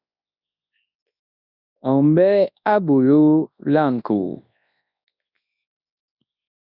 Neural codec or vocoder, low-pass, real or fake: codec, 24 kHz, 0.9 kbps, WavTokenizer, large speech release; 5.4 kHz; fake